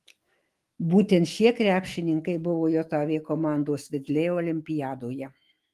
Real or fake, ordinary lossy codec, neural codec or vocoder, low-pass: fake; Opus, 24 kbps; autoencoder, 48 kHz, 128 numbers a frame, DAC-VAE, trained on Japanese speech; 14.4 kHz